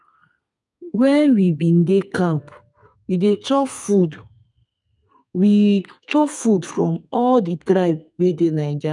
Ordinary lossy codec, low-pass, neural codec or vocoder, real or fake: none; 10.8 kHz; codec, 32 kHz, 1.9 kbps, SNAC; fake